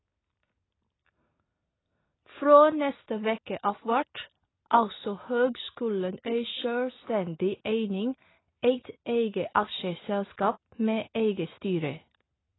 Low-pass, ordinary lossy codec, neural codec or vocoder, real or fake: 7.2 kHz; AAC, 16 kbps; none; real